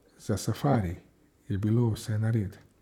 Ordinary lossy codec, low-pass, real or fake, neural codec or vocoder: none; 19.8 kHz; fake; vocoder, 44.1 kHz, 128 mel bands, Pupu-Vocoder